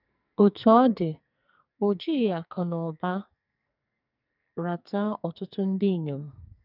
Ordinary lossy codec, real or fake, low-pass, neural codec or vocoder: none; fake; 5.4 kHz; codec, 44.1 kHz, 2.6 kbps, SNAC